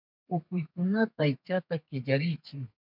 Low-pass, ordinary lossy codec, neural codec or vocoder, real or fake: 5.4 kHz; MP3, 48 kbps; codec, 32 kHz, 1.9 kbps, SNAC; fake